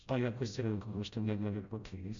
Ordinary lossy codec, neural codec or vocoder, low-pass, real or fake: MP3, 64 kbps; codec, 16 kHz, 0.5 kbps, FreqCodec, smaller model; 7.2 kHz; fake